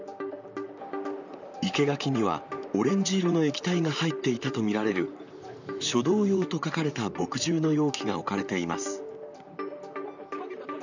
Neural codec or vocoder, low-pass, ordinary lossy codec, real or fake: vocoder, 44.1 kHz, 128 mel bands, Pupu-Vocoder; 7.2 kHz; none; fake